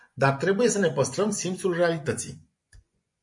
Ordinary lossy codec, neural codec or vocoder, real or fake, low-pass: MP3, 48 kbps; vocoder, 24 kHz, 100 mel bands, Vocos; fake; 10.8 kHz